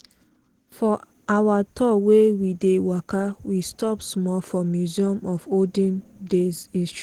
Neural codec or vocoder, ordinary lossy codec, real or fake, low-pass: none; Opus, 16 kbps; real; 19.8 kHz